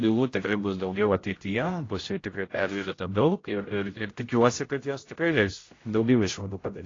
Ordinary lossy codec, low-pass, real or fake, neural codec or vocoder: AAC, 32 kbps; 7.2 kHz; fake; codec, 16 kHz, 0.5 kbps, X-Codec, HuBERT features, trained on general audio